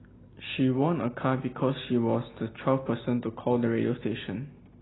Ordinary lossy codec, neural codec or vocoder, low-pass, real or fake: AAC, 16 kbps; none; 7.2 kHz; real